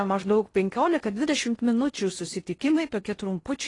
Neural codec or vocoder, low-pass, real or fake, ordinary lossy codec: codec, 16 kHz in and 24 kHz out, 0.6 kbps, FocalCodec, streaming, 4096 codes; 10.8 kHz; fake; AAC, 32 kbps